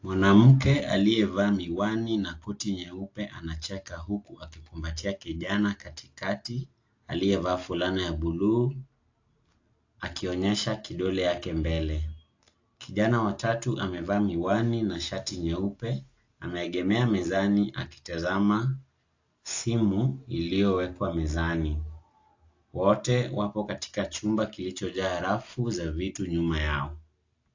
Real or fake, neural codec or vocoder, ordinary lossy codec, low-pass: real; none; AAC, 48 kbps; 7.2 kHz